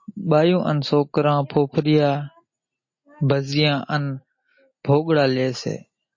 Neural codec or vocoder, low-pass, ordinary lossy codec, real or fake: none; 7.2 kHz; MP3, 32 kbps; real